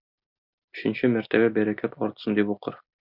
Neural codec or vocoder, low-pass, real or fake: none; 5.4 kHz; real